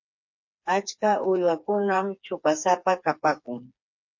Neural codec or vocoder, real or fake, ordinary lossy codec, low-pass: codec, 16 kHz, 4 kbps, FreqCodec, smaller model; fake; MP3, 48 kbps; 7.2 kHz